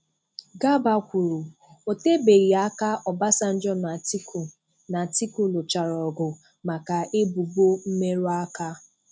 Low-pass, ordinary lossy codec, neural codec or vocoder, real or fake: none; none; none; real